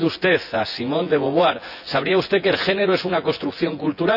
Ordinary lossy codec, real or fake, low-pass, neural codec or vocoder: none; fake; 5.4 kHz; vocoder, 24 kHz, 100 mel bands, Vocos